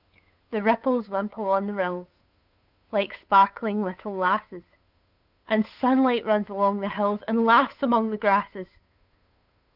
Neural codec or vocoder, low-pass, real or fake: codec, 16 kHz, 8 kbps, FunCodec, trained on Chinese and English, 25 frames a second; 5.4 kHz; fake